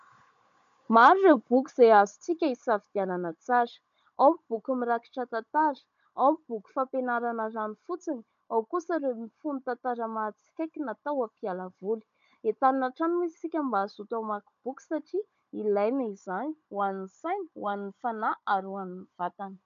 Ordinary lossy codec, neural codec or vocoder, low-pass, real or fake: MP3, 64 kbps; codec, 16 kHz, 16 kbps, FunCodec, trained on Chinese and English, 50 frames a second; 7.2 kHz; fake